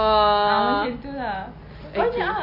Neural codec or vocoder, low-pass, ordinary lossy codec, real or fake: none; 5.4 kHz; AAC, 32 kbps; real